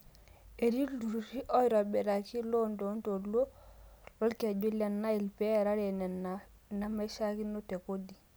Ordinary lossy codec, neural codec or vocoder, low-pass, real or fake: none; none; none; real